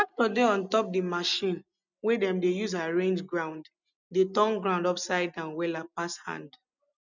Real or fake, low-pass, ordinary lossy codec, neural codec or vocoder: real; 7.2 kHz; none; none